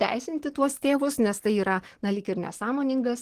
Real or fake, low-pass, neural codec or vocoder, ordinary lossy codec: fake; 14.4 kHz; codec, 44.1 kHz, 7.8 kbps, DAC; Opus, 16 kbps